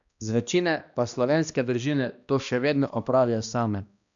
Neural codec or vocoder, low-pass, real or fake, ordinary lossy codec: codec, 16 kHz, 1 kbps, X-Codec, HuBERT features, trained on balanced general audio; 7.2 kHz; fake; none